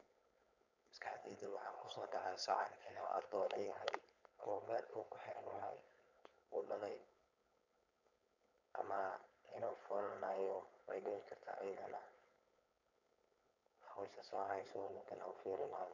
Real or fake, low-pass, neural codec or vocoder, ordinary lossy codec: fake; 7.2 kHz; codec, 16 kHz, 4.8 kbps, FACodec; none